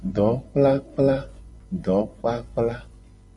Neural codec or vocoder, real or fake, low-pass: vocoder, 24 kHz, 100 mel bands, Vocos; fake; 10.8 kHz